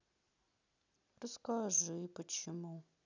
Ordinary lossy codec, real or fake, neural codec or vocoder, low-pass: none; real; none; 7.2 kHz